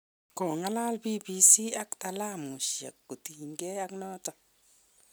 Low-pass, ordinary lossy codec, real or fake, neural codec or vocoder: none; none; real; none